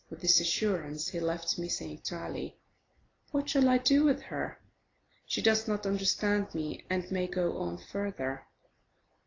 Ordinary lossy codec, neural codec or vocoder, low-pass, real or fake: AAC, 32 kbps; none; 7.2 kHz; real